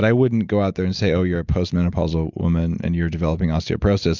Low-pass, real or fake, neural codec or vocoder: 7.2 kHz; real; none